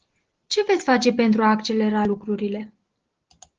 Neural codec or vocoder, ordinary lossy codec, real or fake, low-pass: none; Opus, 16 kbps; real; 7.2 kHz